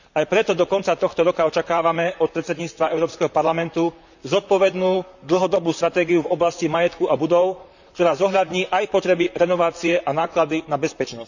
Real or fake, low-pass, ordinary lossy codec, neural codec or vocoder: fake; 7.2 kHz; none; vocoder, 44.1 kHz, 128 mel bands, Pupu-Vocoder